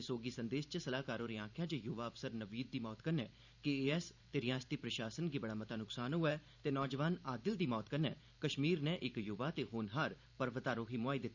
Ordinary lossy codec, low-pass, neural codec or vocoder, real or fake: none; 7.2 kHz; vocoder, 44.1 kHz, 128 mel bands every 512 samples, BigVGAN v2; fake